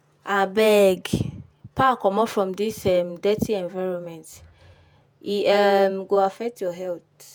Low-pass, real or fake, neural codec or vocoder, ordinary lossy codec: none; fake; vocoder, 48 kHz, 128 mel bands, Vocos; none